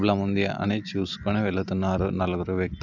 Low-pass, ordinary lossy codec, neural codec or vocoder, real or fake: 7.2 kHz; none; none; real